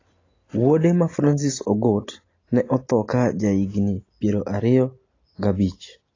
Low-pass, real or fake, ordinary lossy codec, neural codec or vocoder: 7.2 kHz; real; AAC, 32 kbps; none